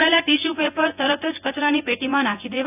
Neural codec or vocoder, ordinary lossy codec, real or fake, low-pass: vocoder, 24 kHz, 100 mel bands, Vocos; none; fake; 3.6 kHz